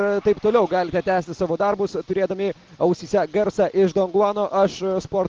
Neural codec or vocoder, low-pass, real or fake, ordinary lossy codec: none; 7.2 kHz; real; Opus, 16 kbps